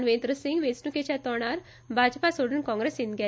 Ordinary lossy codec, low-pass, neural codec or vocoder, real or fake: none; none; none; real